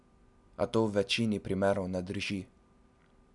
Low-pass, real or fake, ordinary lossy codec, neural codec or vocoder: 10.8 kHz; real; none; none